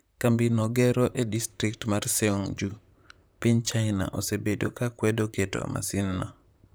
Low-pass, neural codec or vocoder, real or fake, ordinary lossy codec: none; vocoder, 44.1 kHz, 128 mel bands, Pupu-Vocoder; fake; none